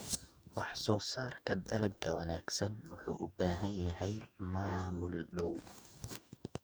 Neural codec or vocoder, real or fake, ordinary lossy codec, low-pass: codec, 44.1 kHz, 2.6 kbps, DAC; fake; none; none